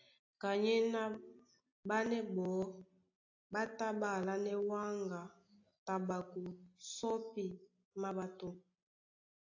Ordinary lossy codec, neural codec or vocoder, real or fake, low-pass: AAC, 48 kbps; none; real; 7.2 kHz